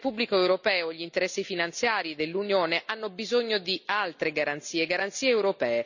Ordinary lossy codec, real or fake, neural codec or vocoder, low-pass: none; real; none; 7.2 kHz